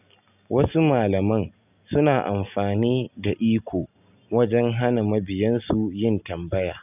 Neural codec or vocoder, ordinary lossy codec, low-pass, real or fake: none; none; 3.6 kHz; real